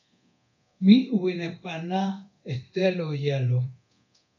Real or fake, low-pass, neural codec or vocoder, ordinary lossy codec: fake; 7.2 kHz; codec, 24 kHz, 0.9 kbps, DualCodec; AAC, 48 kbps